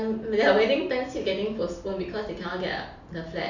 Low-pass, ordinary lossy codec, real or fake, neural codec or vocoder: 7.2 kHz; none; real; none